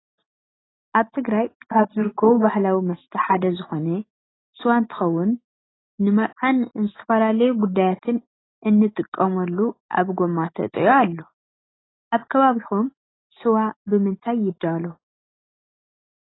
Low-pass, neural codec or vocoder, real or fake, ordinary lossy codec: 7.2 kHz; none; real; AAC, 16 kbps